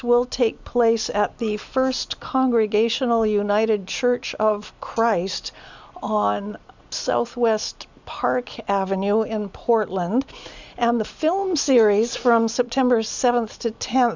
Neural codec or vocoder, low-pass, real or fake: none; 7.2 kHz; real